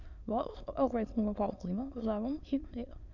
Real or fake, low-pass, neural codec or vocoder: fake; 7.2 kHz; autoencoder, 22.05 kHz, a latent of 192 numbers a frame, VITS, trained on many speakers